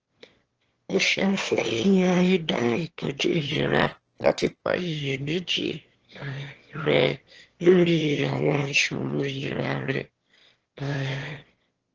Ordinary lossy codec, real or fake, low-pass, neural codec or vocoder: Opus, 16 kbps; fake; 7.2 kHz; autoencoder, 22.05 kHz, a latent of 192 numbers a frame, VITS, trained on one speaker